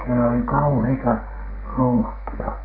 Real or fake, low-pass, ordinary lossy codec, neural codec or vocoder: fake; 5.4 kHz; none; codec, 44.1 kHz, 2.6 kbps, SNAC